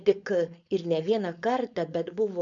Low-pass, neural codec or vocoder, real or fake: 7.2 kHz; codec, 16 kHz, 4.8 kbps, FACodec; fake